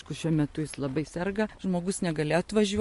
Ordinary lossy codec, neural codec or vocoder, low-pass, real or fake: MP3, 48 kbps; none; 14.4 kHz; real